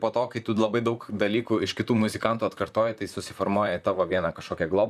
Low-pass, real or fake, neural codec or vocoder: 14.4 kHz; fake; vocoder, 44.1 kHz, 128 mel bands, Pupu-Vocoder